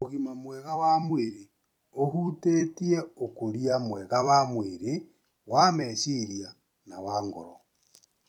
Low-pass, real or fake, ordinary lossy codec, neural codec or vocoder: 19.8 kHz; fake; none; vocoder, 44.1 kHz, 128 mel bands every 256 samples, BigVGAN v2